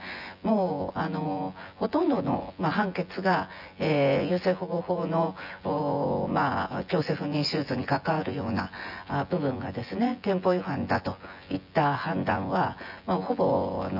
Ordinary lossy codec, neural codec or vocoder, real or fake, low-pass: none; vocoder, 24 kHz, 100 mel bands, Vocos; fake; 5.4 kHz